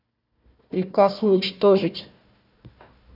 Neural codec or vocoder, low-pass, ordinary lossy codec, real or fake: codec, 16 kHz, 1 kbps, FunCodec, trained on Chinese and English, 50 frames a second; 5.4 kHz; none; fake